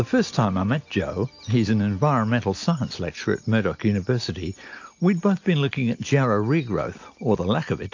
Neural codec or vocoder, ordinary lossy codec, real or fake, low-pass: none; AAC, 48 kbps; real; 7.2 kHz